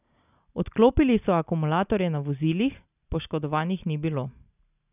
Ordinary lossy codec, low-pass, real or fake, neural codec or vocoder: none; 3.6 kHz; real; none